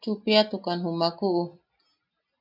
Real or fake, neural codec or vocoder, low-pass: real; none; 5.4 kHz